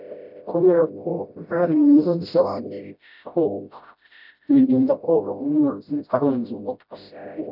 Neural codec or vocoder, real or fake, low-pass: codec, 16 kHz, 0.5 kbps, FreqCodec, smaller model; fake; 5.4 kHz